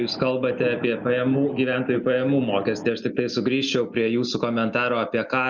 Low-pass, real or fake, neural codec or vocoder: 7.2 kHz; real; none